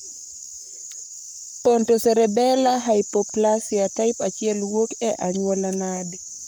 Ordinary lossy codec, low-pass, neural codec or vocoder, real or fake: none; none; codec, 44.1 kHz, 7.8 kbps, Pupu-Codec; fake